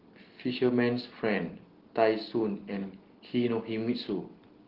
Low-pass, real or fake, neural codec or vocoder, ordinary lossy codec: 5.4 kHz; real; none; Opus, 16 kbps